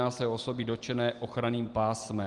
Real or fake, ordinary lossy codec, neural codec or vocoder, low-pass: real; Opus, 24 kbps; none; 10.8 kHz